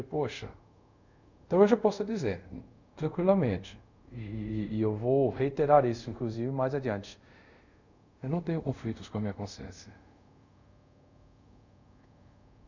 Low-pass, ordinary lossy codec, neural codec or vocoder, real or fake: 7.2 kHz; none; codec, 24 kHz, 0.5 kbps, DualCodec; fake